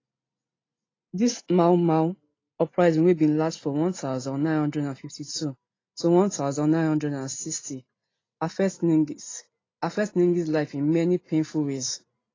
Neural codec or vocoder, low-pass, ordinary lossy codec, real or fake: none; 7.2 kHz; AAC, 32 kbps; real